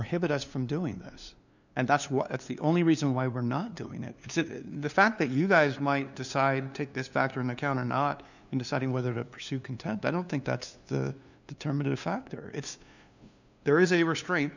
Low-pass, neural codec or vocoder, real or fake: 7.2 kHz; codec, 16 kHz, 2 kbps, FunCodec, trained on LibriTTS, 25 frames a second; fake